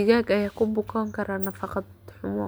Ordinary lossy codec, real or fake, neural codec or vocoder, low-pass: none; real; none; none